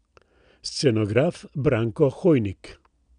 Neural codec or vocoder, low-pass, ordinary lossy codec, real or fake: none; 9.9 kHz; none; real